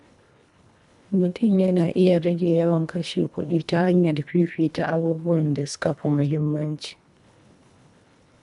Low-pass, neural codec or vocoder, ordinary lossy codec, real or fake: 10.8 kHz; codec, 24 kHz, 1.5 kbps, HILCodec; none; fake